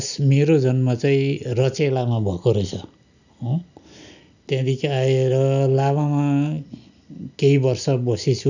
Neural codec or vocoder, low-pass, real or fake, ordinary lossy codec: none; 7.2 kHz; real; none